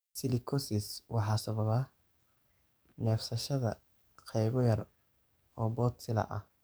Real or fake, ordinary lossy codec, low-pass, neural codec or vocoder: fake; none; none; codec, 44.1 kHz, 7.8 kbps, DAC